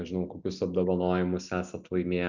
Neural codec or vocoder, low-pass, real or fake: none; 7.2 kHz; real